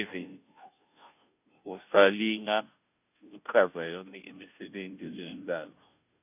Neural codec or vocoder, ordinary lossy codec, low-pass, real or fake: codec, 16 kHz, 0.5 kbps, FunCodec, trained on Chinese and English, 25 frames a second; none; 3.6 kHz; fake